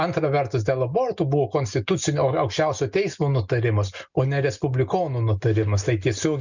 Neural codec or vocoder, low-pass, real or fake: none; 7.2 kHz; real